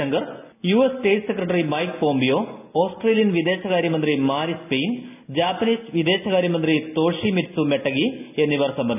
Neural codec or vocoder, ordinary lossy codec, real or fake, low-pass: none; none; real; 3.6 kHz